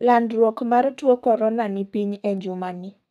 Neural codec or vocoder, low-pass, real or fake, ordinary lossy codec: codec, 32 kHz, 1.9 kbps, SNAC; 14.4 kHz; fake; none